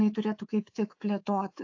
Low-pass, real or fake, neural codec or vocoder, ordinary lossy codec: 7.2 kHz; fake; codec, 24 kHz, 3.1 kbps, DualCodec; AAC, 48 kbps